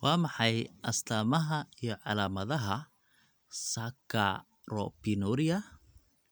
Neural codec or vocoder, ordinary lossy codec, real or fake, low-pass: none; none; real; none